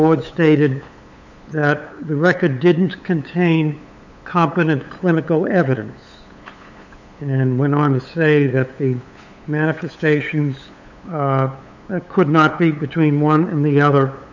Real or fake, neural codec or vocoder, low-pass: fake; codec, 16 kHz, 8 kbps, FunCodec, trained on LibriTTS, 25 frames a second; 7.2 kHz